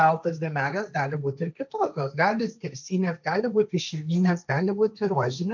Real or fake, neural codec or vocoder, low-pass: fake; codec, 16 kHz, 1.1 kbps, Voila-Tokenizer; 7.2 kHz